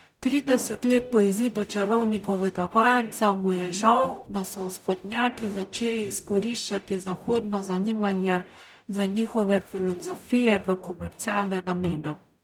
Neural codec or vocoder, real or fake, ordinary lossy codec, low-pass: codec, 44.1 kHz, 0.9 kbps, DAC; fake; none; 19.8 kHz